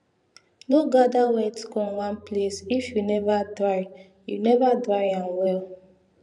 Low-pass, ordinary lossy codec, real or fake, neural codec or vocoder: 10.8 kHz; none; fake; vocoder, 44.1 kHz, 128 mel bands every 512 samples, BigVGAN v2